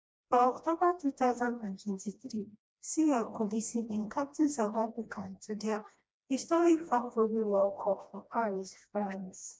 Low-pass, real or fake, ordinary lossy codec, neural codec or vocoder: none; fake; none; codec, 16 kHz, 1 kbps, FreqCodec, smaller model